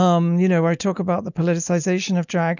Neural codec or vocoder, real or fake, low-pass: none; real; 7.2 kHz